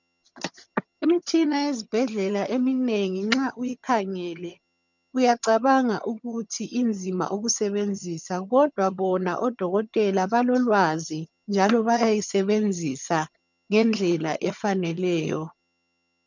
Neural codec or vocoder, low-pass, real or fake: vocoder, 22.05 kHz, 80 mel bands, HiFi-GAN; 7.2 kHz; fake